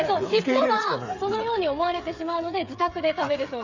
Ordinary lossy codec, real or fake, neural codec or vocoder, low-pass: Opus, 64 kbps; fake; codec, 16 kHz, 8 kbps, FreqCodec, smaller model; 7.2 kHz